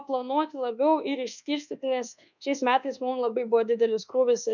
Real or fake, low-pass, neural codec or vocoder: fake; 7.2 kHz; codec, 24 kHz, 1.2 kbps, DualCodec